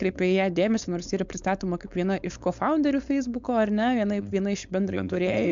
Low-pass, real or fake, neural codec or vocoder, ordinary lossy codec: 7.2 kHz; fake; codec, 16 kHz, 4.8 kbps, FACodec; MP3, 64 kbps